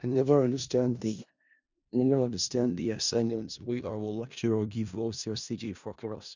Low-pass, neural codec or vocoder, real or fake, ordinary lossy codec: 7.2 kHz; codec, 16 kHz in and 24 kHz out, 0.4 kbps, LongCat-Audio-Codec, four codebook decoder; fake; Opus, 64 kbps